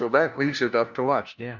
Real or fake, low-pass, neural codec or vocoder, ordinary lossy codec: fake; 7.2 kHz; codec, 16 kHz, 1 kbps, FunCodec, trained on LibriTTS, 50 frames a second; Opus, 64 kbps